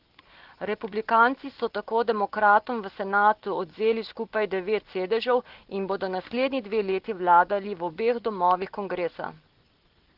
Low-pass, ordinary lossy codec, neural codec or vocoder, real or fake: 5.4 kHz; Opus, 16 kbps; none; real